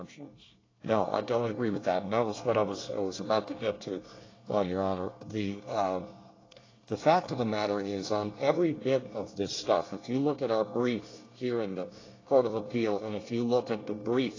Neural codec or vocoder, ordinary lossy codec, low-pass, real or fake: codec, 24 kHz, 1 kbps, SNAC; AAC, 32 kbps; 7.2 kHz; fake